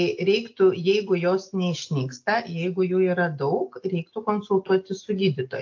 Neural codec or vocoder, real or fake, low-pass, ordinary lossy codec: none; real; 7.2 kHz; AAC, 48 kbps